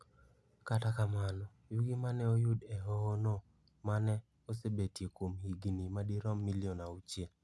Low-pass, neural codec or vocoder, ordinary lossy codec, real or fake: none; none; none; real